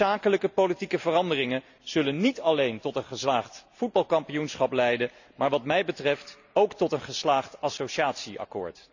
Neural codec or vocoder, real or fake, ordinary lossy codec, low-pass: none; real; none; 7.2 kHz